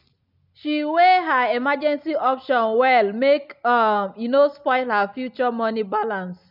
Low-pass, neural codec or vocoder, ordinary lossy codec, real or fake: 5.4 kHz; none; none; real